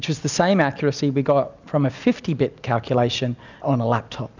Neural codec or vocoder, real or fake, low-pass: none; real; 7.2 kHz